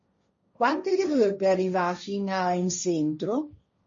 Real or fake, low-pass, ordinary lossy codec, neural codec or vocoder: fake; 7.2 kHz; MP3, 32 kbps; codec, 16 kHz, 1.1 kbps, Voila-Tokenizer